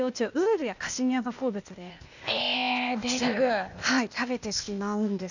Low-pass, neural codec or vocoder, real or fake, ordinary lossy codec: 7.2 kHz; codec, 16 kHz, 0.8 kbps, ZipCodec; fake; none